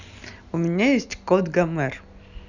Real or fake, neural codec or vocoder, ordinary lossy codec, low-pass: real; none; none; 7.2 kHz